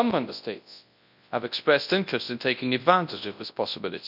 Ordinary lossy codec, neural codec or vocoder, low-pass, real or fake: none; codec, 24 kHz, 0.9 kbps, WavTokenizer, large speech release; 5.4 kHz; fake